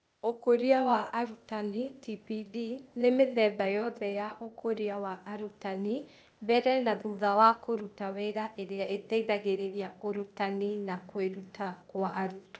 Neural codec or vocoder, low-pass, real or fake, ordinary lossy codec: codec, 16 kHz, 0.8 kbps, ZipCodec; none; fake; none